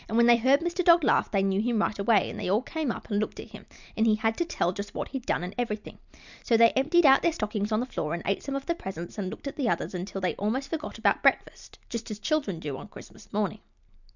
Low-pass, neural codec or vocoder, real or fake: 7.2 kHz; none; real